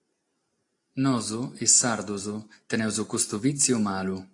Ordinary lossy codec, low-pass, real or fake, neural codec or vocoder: AAC, 48 kbps; 10.8 kHz; real; none